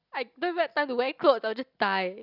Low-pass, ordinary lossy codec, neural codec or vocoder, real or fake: 5.4 kHz; Opus, 64 kbps; none; real